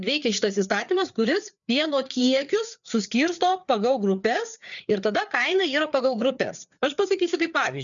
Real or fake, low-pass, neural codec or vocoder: fake; 7.2 kHz; codec, 16 kHz, 4 kbps, FreqCodec, larger model